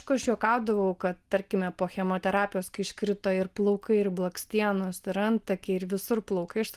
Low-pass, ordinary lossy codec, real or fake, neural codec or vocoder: 14.4 kHz; Opus, 24 kbps; real; none